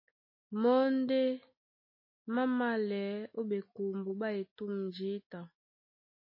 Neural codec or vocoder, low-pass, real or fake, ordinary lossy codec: none; 5.4 kHz; real; MP3, 24 kbps